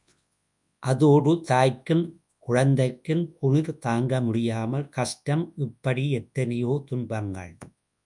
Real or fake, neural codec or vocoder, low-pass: fake; codec, 24 kHz, 0.9 kbps, WavTokenizer, large speech release; 10.8 kHz